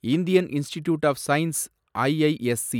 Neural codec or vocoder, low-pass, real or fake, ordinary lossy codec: none; 19.8 kHz; real; none